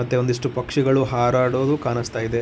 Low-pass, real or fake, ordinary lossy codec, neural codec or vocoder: none; real; none; none